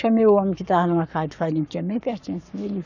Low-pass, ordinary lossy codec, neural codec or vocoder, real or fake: 7.2 kHz; none; codec, 44.1 kHz, 7.8 kbps, Pupu-Codec; fake